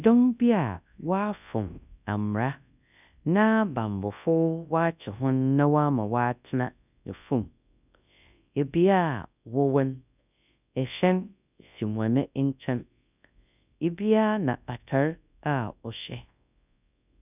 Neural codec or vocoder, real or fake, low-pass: codec, 24 kHz, 0.9 kbps, WavTokenizer, large speech release; fake; 3.6 kHz